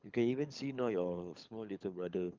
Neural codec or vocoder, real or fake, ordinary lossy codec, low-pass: codec, 24 kHz, 6 kbps, HILCodec; fake; Opus, 24 kbps; 7.2 kHz